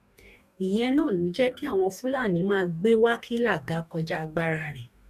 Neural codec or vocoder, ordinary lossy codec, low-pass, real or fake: codec, 44.1 kHz, 2.6 kbps, DAC; none; 14.4 kHz; fake